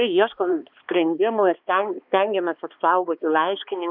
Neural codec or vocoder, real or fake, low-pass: codec, 16 kHz, 2 kbps, X-Codec, HuBERT features, trained on balanced general audio; fake; 5.4 kHz